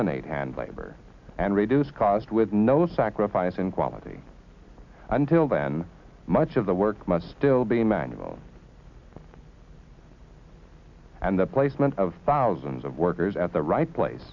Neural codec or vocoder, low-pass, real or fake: none; 7.2 kHz; real